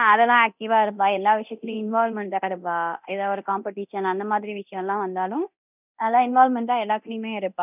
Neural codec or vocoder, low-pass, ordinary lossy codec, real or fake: codec, 24 kHz, 0.9 kbps, DualCodec; 3.6 kHz; none; fake